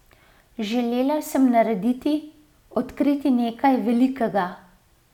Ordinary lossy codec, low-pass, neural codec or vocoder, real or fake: none; 19.8 kHz; none; real